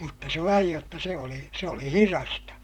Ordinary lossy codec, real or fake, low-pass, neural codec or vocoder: none; fake; 19.8 kHz; vocoder, 44.1 kHz, 128 mel bands, Pupu-Vocoder